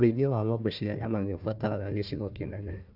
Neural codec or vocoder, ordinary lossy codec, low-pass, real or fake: codec, 16 kHz, 1 kbps, FunCodec, trained on Chinese and English, 50 frames a second; AAC, 48 kbps; 5.4 kHz; fake